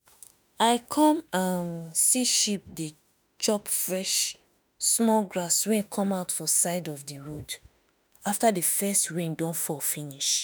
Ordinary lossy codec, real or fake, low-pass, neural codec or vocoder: none; fake; none; autoencoder, 48 kHz, 32 numbers a frame, DAC-VAE, trained on Japanese speech